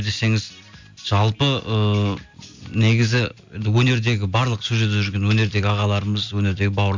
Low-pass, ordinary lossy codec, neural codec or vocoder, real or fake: 7.2 kHz; MP3, 64 kbps; none; real